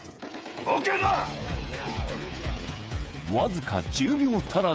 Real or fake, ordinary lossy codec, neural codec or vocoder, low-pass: fake; none; codec, 16 kHz, 8 kbps, FreqCodec, smaller model; none